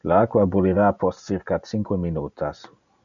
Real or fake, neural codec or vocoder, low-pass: real; none; 7.2 kHz